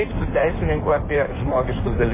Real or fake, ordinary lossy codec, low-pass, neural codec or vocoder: fake; MP3, 16 kbps; 3.6 kHz; codec, 16 kHz in and 24 kHz out, 1.1 kbps, FireRedTTS-2 codec